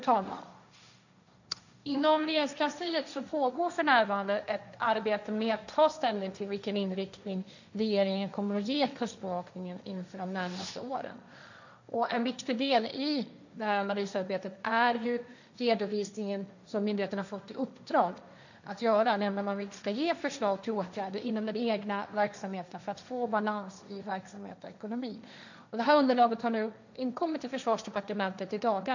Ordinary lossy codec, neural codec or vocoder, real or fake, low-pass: none; codec, 16 kHz, 1.1 kbps, Voila-Tokenizer; fake; 7.2 kHz